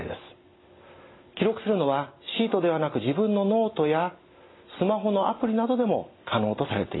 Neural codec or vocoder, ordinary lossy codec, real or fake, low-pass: none; AAC, 16 kbps; real; 7.2 kHz